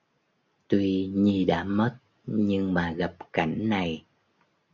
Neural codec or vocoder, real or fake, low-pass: none; real; 7.2 kHz